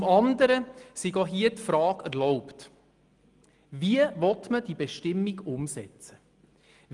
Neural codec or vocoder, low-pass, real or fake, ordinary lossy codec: none; 10.8 kHz; real; Opus, 32 kbps